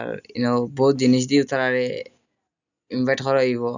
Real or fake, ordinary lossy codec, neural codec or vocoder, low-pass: real; none; none; 7.2 kHz